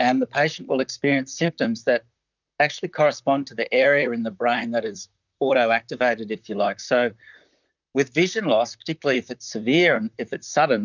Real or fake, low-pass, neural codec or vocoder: fake; 7.2 kHz; vocoder, 44.1 kHz, 128 mel bands, Pupu-Vocoder